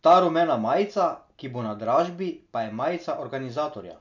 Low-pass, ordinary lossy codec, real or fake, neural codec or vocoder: 7.2 kHz; none; real; none